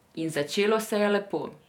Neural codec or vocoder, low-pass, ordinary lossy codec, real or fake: vocoder, 48 kHz, 128 mel bands, Vocos; 19.8 kHz; none; fake